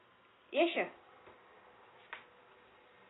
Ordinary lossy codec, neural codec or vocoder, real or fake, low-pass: AAC, 16 kbps; none; real; 7.2 kHz